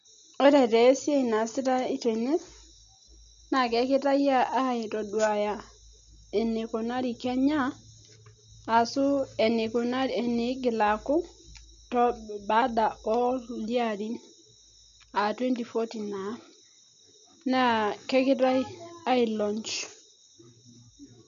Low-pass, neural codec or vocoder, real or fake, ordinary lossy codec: 7.2 kHz; none; real; none